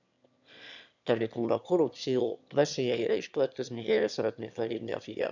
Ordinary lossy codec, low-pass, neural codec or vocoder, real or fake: none; 7.2 kHz; autoencoder, 22.05 kHz, a latent of 192 numbers a frame, VITS, trained on one speaker; fake